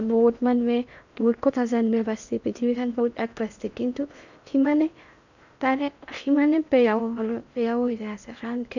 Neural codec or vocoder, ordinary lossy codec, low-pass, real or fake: codec, 16 kHz in and 24 kHz out, 0.8 kbps, FocalCodec, streaming, 65536 codes; none; 7.2 kHz; fake